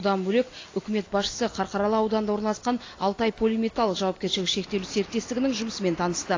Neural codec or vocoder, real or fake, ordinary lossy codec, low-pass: none; real; AAC, 32 kbps; 7.2 kHz